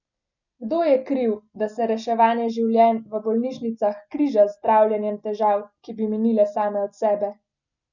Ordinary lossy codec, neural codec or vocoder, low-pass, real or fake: none; none; 7.2 kHz; real